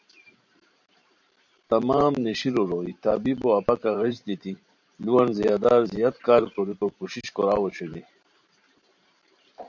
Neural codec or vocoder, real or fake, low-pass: vocoder, 44.1 kHz, 128 mel bands every 512 samples, BigVGAN v2; fake; 7.2 kHz